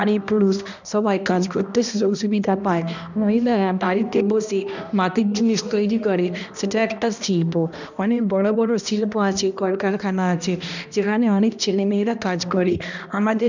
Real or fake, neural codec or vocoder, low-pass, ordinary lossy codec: fake; codec, 16 kHz, 1 kbps, X-Codec, HuBERT features, trained on balanced general audio; 7.2 kHz; none